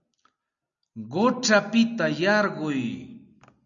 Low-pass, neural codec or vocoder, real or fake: 7.2 kHz; none; real